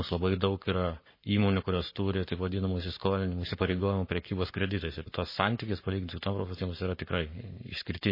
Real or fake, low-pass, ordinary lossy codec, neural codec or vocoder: fake; 5.4 kHz; MP3, 24 kbps; codec, 44.1 kHz, 7.8 kbps, Pupu-Codec